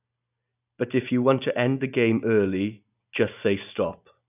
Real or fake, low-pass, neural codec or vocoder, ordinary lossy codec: real; 3.6 kHz; none; none